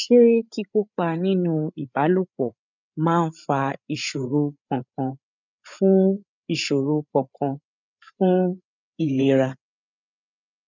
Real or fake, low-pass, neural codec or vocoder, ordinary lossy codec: fake; 7.2 kHz; codec, 16 kHz, 8 kbps, FreqCodec, larger model; none